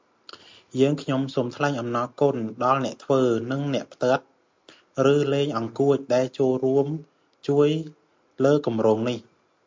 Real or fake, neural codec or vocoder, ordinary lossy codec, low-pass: real; none; MP3, 64 kbps; 7.2 kHz